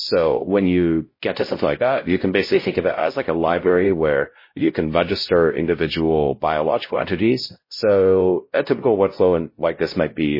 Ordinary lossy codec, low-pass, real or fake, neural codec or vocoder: MP3, 24 kbps; 5.4 kHz; fake; codec, 16 kHz, 0.5 kbps, X-Codec, WavLM features, trained on Multilingual LibriSpeech